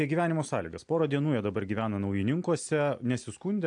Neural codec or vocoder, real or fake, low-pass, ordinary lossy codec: none; real; 9.9 kHz; AAC, 64 kbps